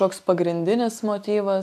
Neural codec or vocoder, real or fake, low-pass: none; real; 14.4 kHz